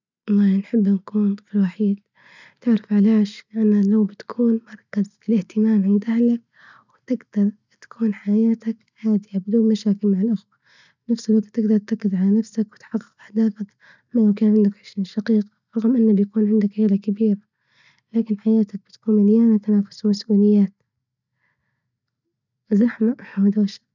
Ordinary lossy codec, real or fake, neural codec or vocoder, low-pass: none; real; none; 7.2 kHz